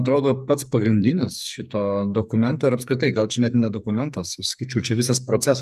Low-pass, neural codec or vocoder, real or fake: 14.4 kHz; codec, 44.1 kHz, 2.6 kbps, SNAC; fake